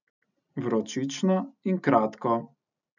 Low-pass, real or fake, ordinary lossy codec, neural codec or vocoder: 7.2 kHz; real; none; none